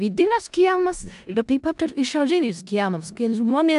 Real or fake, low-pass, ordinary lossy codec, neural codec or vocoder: fake; 10.8 kHz; AAC, 96 kbps; codec, 16 kHz in and 24 kHz out, 0.4 kbps, LongCat-Audio-Codec, four codebook decoder